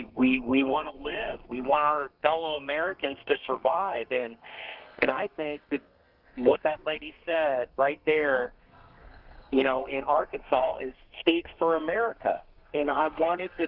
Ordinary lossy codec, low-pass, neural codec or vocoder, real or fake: Opus, 24 kbps; 5.4 kHz; codec, 32 kHz, 1.9 kbps, SNAC; fake